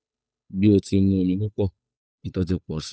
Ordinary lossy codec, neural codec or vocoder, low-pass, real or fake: none; codec, 16 kHz, 8 kbps, FunCodec, trained on Chinese and English, 25 frames a second; none; fake